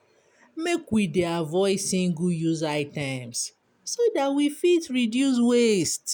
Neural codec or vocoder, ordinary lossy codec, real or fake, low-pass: none; none; real; none